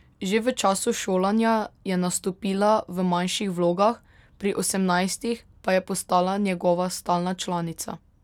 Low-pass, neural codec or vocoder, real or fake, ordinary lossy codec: 19.8 kHz; none; real; none